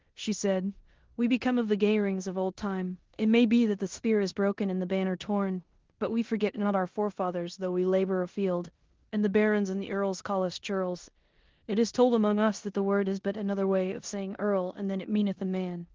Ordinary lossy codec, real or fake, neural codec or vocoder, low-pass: Opus, 16 kbps; fake; codec, 16 kHz in and 24 kHz out, 0.9 kbps, LongCat-Audio-Codec, four codebook decoder; 7.2 kHz